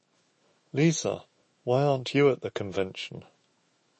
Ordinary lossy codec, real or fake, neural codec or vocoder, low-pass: MP3, 32 kbps; fake; autoencoder, 48 kHz, 128 numbers a frame, DAC-VAE, trained on Japanese speech; 10.8 kHz